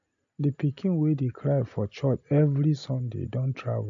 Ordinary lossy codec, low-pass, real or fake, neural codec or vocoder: AAC, 64 kbps; 7.2 kHz; real; none